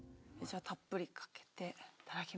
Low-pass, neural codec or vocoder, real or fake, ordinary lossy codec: none; none; real; none